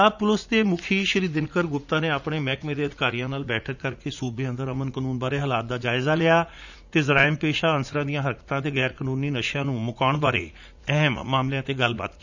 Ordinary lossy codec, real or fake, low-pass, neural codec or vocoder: none; fake; 7.2 kHz; vocoder, 44.1 kHz, 80 mel bands, Vocos